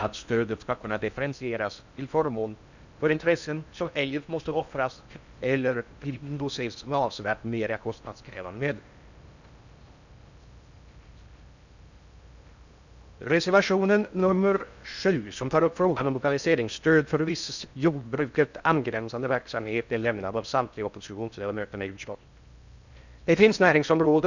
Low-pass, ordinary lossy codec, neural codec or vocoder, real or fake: 7.2 kHz; none; codec, 16 kHz in and 24 kHz out, 0.6 kbps, FocalCodec, streaming, 4096 codes; fake